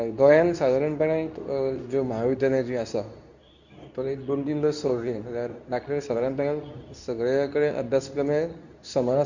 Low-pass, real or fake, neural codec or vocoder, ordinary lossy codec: 7.2 kHz; fake; codec, 24 kHz, 0.9 kbps, WavTokenizer, medium speech release version 2; none